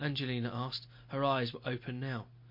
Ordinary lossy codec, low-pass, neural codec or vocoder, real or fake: MP3, 32 kbps; 5.4 kHz; none; real